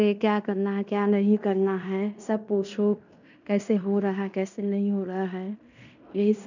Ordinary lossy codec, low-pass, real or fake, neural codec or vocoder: none; 7.2 kHz; fake; codec, 16 kHz in and 24 kHz out, 0.9 kbps, LongCat-Audio-Codec, fine tuned four codebook decoder